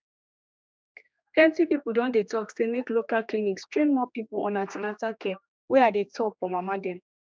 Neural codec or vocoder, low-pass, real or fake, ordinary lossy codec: codec, 16 kHz, 2 kbps, X-Codec, HuBERT features, trained on general audio; none; fake; none